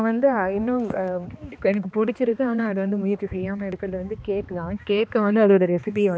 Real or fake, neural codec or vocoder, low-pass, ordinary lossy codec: fake; codec, 16 kHz, 2 kbps, X-Codec, HuBERT features, trained on balanced general audio; none; none